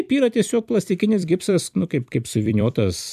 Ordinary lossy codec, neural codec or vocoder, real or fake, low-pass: MP3, 96 kbps; vocoder, 44.1 kHz, 128 mel bands every 256 samples, BigVGAN v2; fake; 14.4 kHz